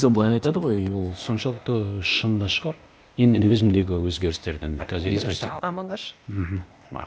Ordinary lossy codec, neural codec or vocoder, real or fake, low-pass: none; codec, 16 kHz, 0.8 kbps, ZipCodec; fake; none